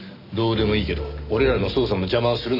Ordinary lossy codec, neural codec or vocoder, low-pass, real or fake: none; none; 5.4 kHz; real